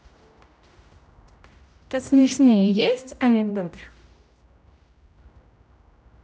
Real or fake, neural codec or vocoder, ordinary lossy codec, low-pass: fake; codec, 16 kHz, 0.5 kbps, X-Codec, HuBERT features, trained on general audio; none; none